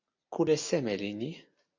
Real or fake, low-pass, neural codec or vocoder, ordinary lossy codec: real; 7.2 kHz; none; AAC, 48 kbps